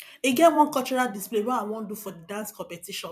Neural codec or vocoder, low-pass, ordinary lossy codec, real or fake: vocoder, 44.1 kHz, 128 mel bands every 256 samples, BigVGAN v2; 14.4 kHz; none; fake